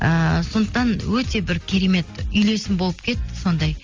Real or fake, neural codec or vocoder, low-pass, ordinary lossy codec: real; none; 7.2 kHz; Opus, 32 kbps